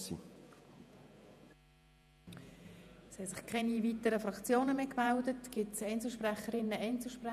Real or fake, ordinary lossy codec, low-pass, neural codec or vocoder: fake; none; 14.4 kHz; vocoder, 48 kHz, 128 mel bands, Vocos